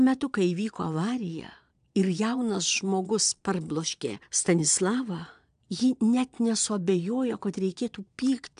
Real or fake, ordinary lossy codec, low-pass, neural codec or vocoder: fake; AAC, 96 kbps; 9.9 kHz; vocoder, 22.05 kHz, 80 mel bands, Vocos